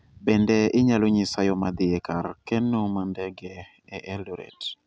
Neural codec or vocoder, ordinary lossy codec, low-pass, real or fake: none; none; none; real